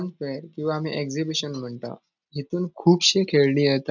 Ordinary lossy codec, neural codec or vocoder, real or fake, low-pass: none; none; real; 7.2 kHz